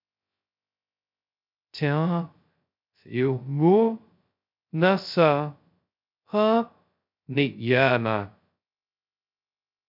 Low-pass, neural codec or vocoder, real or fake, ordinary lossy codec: 5.4 kHz; codec, 16 kHz, 0.2 kbps, FocalCodec; fake; MP3, 48 kbps